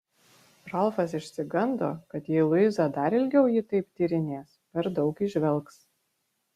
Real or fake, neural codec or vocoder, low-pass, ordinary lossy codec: real; none; 14.4 kHz; Opus, 64 kbps